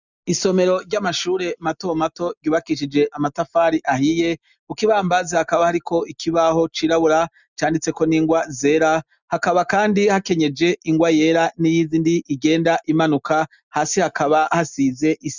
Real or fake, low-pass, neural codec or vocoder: real; 7.2 kHz; none